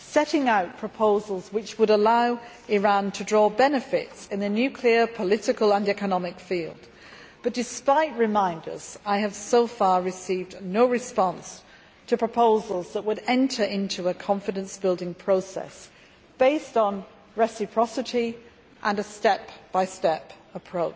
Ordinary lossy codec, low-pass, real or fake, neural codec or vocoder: none; none; real; none